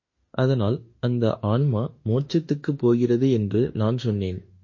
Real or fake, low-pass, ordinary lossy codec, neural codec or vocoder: fake; 7.2 kHz; MP3, 32 kbps; autoencoder, 48 kHz, 32 numbers a frame, DAC-VAE, trained on Japanese speech